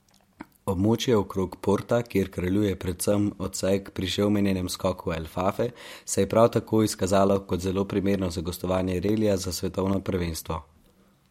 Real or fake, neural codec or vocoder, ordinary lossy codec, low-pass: real; none; MP3, 64 kbps; 19.8 kHz